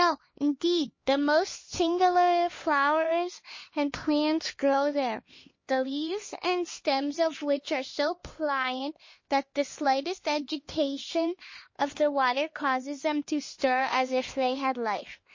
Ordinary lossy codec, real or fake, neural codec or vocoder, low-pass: MP3, 32 kbps; fake; codec, 16 kHz, 2 kbps, X-Codec, HuBERT features, trained on LibriSpeech; 7.2 kHz